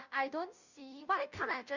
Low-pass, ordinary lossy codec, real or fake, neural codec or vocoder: 7.2 kHz; Opus, 64 kbps; fake; codec, 16 kHz, 0.5 kbps, FunCodec, trained on Chinese and English, 25 frames a second